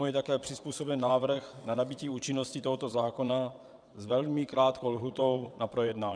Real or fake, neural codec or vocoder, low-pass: fake; vocoder, 22.05 kHz, 80 mel bands, WaveNeXt; 9.9 kHz